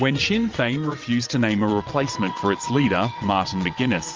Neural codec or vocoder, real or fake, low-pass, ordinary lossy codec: vocoder, 22.05 kHz, 80 mel bands, WaveNeXt; fake; 7.2 kHz; Opus, 24 kbps